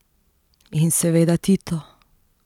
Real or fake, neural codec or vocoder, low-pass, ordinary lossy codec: real; none; 19.8 kHz; none